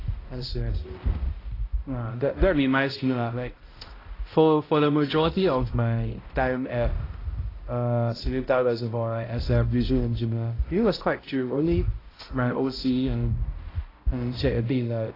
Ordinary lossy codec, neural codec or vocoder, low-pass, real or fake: AAC, 24 kbps; codec, 16 kHz, 0.5 kbps, X-Codec, HuBERT features, trained on balanced general audio; 5.4 kHz; fake